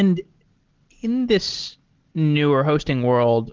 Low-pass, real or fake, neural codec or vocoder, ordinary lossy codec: 7.2 kHz; real; none; Opus, 16 kbps